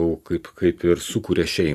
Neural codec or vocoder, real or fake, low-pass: none; real; 14.4 kHz